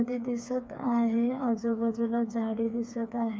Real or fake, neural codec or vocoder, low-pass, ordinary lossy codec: fake; codec, 16 kHz, 4 kbps, FreqCodec, smaller model; none; none